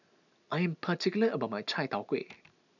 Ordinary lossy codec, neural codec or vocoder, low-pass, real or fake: none; none; 7.2 kHz; real